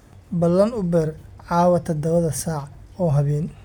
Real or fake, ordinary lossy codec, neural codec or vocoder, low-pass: real; none; none; 19.8 kHz